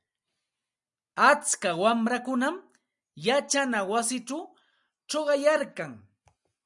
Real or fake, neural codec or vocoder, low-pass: real; none; 10.8 kHz